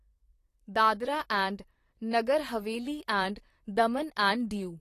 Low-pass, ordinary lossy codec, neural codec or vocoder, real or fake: 14.4 kHz; AAC, 48 kbps; vocoder, 44.1 kHz, 128 mel bands, Pupu-Vocoder; fake